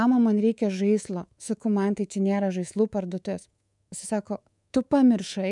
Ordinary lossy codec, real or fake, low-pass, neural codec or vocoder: MP3, 96 kbps; fake; 10.8 kHz; codec, 24 kHz, 3.1 kbps, DualCodec